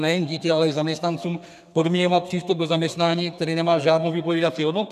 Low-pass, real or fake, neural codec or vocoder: 14.4 kHz; fake; codec, 32 kHz, 1.9 kbps, SNAC